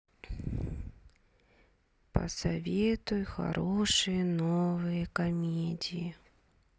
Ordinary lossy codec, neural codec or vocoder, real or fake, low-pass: none; none; real; none